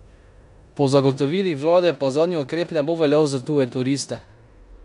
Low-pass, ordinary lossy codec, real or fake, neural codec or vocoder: 10.8 kHz; none; fake; codec, 16 kHz in and 24 kHz out, 0.9 kbps, LongCat-Audio-Codec, four codebook decoder